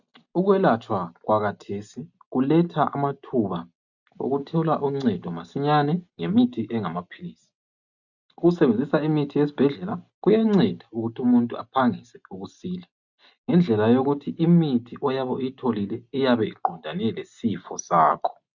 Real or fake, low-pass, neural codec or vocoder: real; 7.2 kHz; none